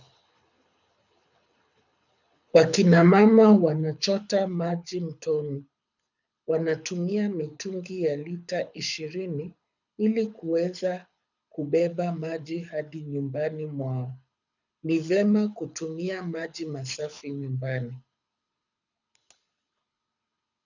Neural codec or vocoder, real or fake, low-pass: codec, 24 kHz, 6 kbps, HILCodec; fake; 7.2 kHz